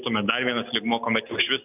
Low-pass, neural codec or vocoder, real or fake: 3.6 kHz; none; real